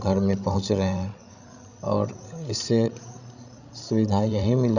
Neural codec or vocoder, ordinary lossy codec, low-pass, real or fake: codec, 16 kHz, 16 kbps, FreqCodec, larger model; none; 7.2 kHz; fake